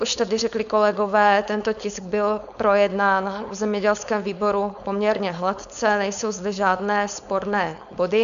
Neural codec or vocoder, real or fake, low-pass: codec, 16 kHz, 4.8 kbps, FACodec; fake; 7.2 kHz